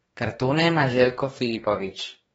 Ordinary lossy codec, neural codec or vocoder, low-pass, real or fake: AAC, 24 kbps; codec, 44.1 kHz, 2.6 kbps, DAC; 19.8 kHz; fake